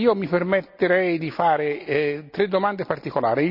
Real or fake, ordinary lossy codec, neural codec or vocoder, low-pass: real; none; none; 5.4 kHz